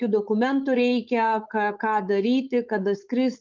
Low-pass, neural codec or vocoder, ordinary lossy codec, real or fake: 7.2 kHz; codec, 16 kHz, 4.8 kbps, FACodec; Opus, 24 kbps; fake